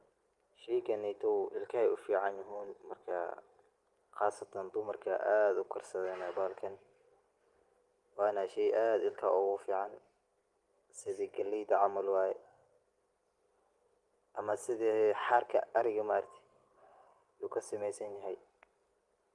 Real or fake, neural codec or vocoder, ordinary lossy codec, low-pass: real; none; Opus, 24 kbps; 10.8 kHz